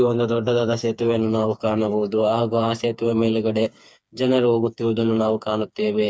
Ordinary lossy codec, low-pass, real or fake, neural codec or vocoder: none; none; fake; codec, 16 kHz, 4 kbps, FreqCodec, smaller model